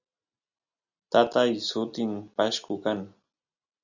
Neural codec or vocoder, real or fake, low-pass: none; real; 7.2 kHz